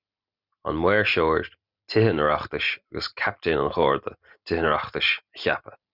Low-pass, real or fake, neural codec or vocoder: 5.4 kHz; real; none